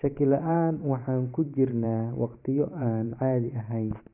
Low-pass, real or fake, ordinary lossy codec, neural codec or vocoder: 3.6 kHz; fake; AAC, 32 kbps; vocoder, 44.1 kHz, 128 mel bands every 512 samples, BigVGAN v2